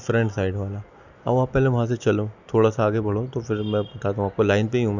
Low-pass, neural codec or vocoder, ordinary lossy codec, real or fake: 7.2 kHz; none; none; real